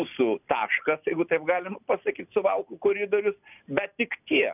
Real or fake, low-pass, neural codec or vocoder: real; 3.6 kHz; none